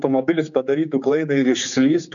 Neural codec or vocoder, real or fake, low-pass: codec, 16 kHz, 4 kbps, X-Codec, HuBERT features, trained on general audio; fake; 7.2 kHz